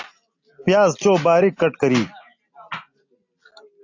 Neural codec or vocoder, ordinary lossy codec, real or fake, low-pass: none; AAC, 48 kbps; real; 7.2 kHz